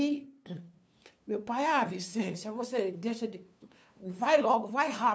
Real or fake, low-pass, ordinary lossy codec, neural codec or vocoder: fake; none; none; codec, 16 kHz, 2 kbps, FunCodec, trained on LibriTTS, 25 frames a second